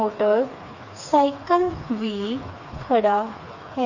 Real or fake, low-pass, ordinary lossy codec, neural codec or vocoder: fake; 7.2 kHz; none; codec, 16 kHz, 4 kbps, FreqCodec, smaller model